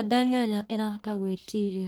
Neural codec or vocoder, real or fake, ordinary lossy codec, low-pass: codec, 44.1 kHz, 1.7 kbps, Pupu-Codec; fake; none; none